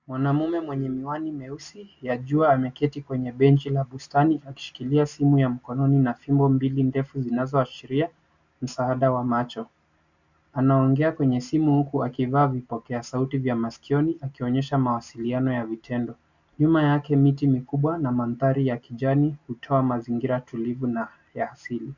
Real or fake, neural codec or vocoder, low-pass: real; none; 7.2 kHz